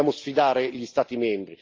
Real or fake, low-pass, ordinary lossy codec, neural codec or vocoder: real; 7.2 kHz; Opus, 32 kbps; none